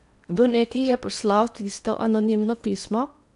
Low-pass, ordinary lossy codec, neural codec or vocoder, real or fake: 10.8 kHz; none; codec, 16 kHz in and 24 kHz out, 0.8 kbps, FocalCodec, streaming, 65536 codes; fake